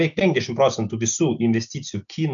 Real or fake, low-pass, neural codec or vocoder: real; 7.2 kHz; none